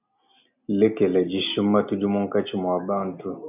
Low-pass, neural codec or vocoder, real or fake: 3.6 kHz; none; real